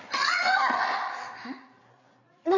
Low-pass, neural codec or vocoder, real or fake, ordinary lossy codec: 7.2 kHz; codec, 16 kHz, 8 kbps, FreqCodec, larger model; fake; none